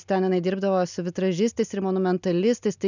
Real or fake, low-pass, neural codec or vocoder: real; 7.2 kHz; none